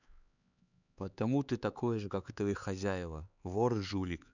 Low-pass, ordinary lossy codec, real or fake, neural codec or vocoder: 7.2 kHz; none; fake; codec, 16 kHz, 4 kbps, X-Codec, HuBERT features, trained on LibriSpeech